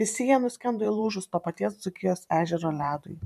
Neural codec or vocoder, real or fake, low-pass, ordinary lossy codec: vocoder, 44.1 kHz, 128 mel bands every 512 samples, BigVGAN v2; fake; 14.4 kHz; MP3, 96 kbps